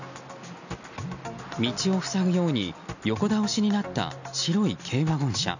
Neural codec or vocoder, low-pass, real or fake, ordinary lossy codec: none; 7.2 kHz; real; none